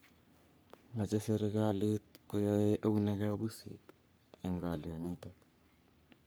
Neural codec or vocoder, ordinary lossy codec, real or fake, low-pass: codec, 44.1 kHz, 3.4 kbps, Pupu-Codec; none; fake; none